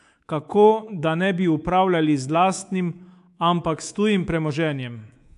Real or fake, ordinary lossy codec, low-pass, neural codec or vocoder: fake; AAC, 64 kbps; 10.8 kHz; codec, 24 kHz, 3.1 kbps, DualCodec